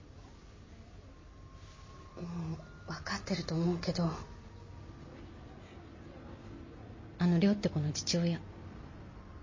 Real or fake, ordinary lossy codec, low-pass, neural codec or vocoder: real; MP3, 32 kbps; 7.2 kHz; none